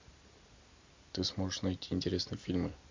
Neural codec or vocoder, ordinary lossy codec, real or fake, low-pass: none; MP3, 64 kbps; real; 7.2 kHz